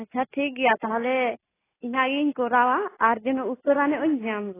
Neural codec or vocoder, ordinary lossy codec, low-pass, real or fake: none; AAC, 16 kbps; 3.6 kHz; real